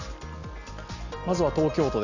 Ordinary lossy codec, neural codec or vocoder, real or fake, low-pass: none; none; real; 7.2 kHz